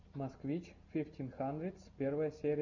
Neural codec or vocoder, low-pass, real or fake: none; 7.2 kHz; real